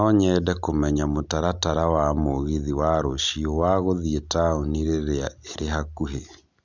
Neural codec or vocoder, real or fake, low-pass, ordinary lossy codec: none; real; 7.2 kHz; none